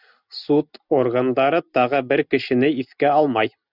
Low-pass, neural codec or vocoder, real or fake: 5.4 kHz; none; real